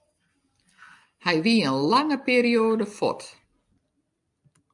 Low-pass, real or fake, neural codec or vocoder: 10.8 kHz; real; none